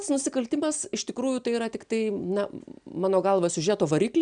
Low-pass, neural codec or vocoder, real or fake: 9.9 kHz; none; real